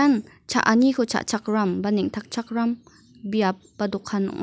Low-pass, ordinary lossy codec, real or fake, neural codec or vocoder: none; none; real; none